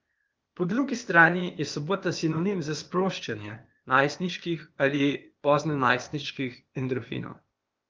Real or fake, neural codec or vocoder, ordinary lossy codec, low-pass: fake; codec, 16 kHz, 0.8 kbps, ZipCodec; Opus, 24 kbps; 7.2 kHz